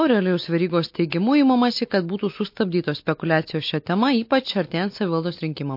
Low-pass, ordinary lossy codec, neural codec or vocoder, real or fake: 5.4 kHz; MP3, 32 kbps; none; real